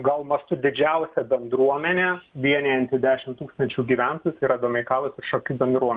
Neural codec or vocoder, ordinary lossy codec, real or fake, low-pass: none; Opus, 32 kbps; real; 9.9 kHz